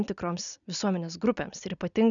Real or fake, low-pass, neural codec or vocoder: real; 7.2 kHz; none